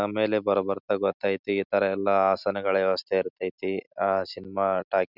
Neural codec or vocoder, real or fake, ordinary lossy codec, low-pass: none; real; none; 5.4 kHz